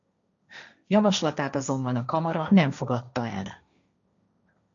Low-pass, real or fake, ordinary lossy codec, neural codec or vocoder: 7.2 kHz; fake; MP3, 96 kbps; codec, 16 kHz, 1.1 kbps, Voila-Tokenizer